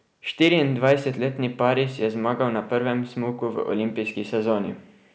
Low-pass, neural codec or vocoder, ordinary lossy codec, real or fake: none; none; none; real